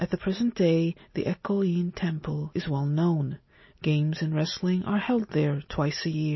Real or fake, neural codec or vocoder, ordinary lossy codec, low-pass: real; none; MP3, 24 kbps; 7.2 kHz